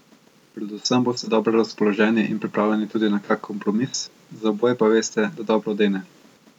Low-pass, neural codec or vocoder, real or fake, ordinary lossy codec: 19.8 kHz; none; real; none